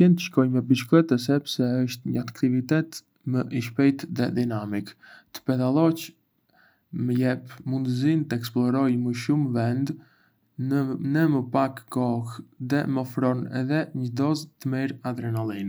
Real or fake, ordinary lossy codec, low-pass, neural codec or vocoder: real; none; none; none